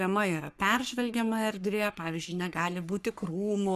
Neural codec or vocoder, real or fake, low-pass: codec, 44.1 kHz, 3.4 kbps, Pupu-Codec; fake; 14.4 kHz